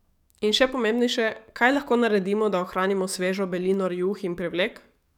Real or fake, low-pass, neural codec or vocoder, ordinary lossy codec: fake; 19.8 kHz; codec, 44.1 kHz, 7.8 kbps, DAC; none